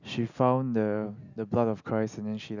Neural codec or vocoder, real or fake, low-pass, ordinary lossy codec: none; real; 7.2 kHz; none